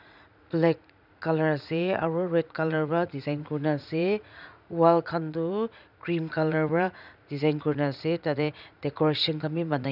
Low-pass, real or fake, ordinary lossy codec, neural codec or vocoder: 5.4 kHz; fake; none; vocoder, 44.1 kHz, 80 mel bands, Vocos